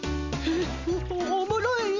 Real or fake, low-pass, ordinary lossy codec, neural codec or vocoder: real; 7.2 kHz; MP3, 48 kbps; none